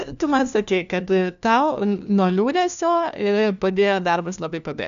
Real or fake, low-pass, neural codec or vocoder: fake; 7.2 kHz; codec, 16 kHz, 1 kbps, FunCodec, trained on LibriTTS, 50 frames a second